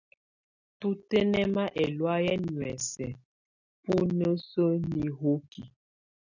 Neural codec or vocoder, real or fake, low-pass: none; real; 7.2 kHz